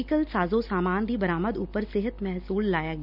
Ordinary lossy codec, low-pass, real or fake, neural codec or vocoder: none; 5.4 kHz; real; none